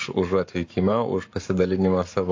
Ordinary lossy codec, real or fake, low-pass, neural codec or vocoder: AAC, 48 kbps; fake; 7.2 kHz; codec, 44.1 kHz, 7.8 kbps, Pupu-Codec